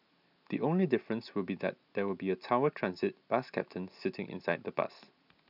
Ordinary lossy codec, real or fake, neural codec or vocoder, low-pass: none; real; none; 5.4 kHz